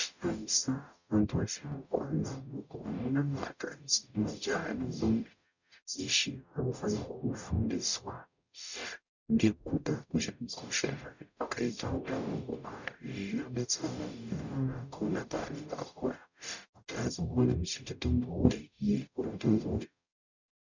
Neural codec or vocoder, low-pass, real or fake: codec, 44.1 kHz, 0.9 kbps, DAC; 7.2 kHz; fake